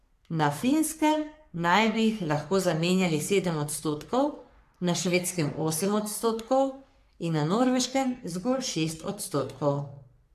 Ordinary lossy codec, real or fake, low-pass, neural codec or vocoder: AAC, 96 kbps; fake; 14.4 kHz; codec, 44.1 kHz, 3.4 kbps, Pupu-Codec